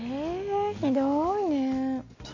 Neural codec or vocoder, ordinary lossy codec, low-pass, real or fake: none; AAC, 32 kbps; 7.2 kHz; real